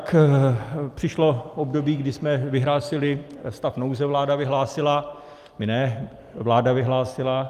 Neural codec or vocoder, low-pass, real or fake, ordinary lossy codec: none; 14.4 kHz; real; Opus, 32 kbps